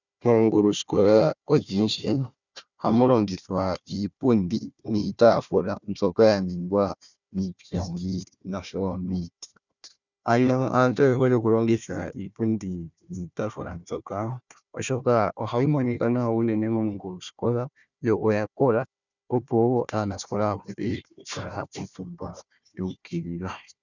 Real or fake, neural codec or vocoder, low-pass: fake; codec, 16 kHz, 1 kbps, FunCodec, trained on Chinese and English, 50 frames a second; 7.2 kHz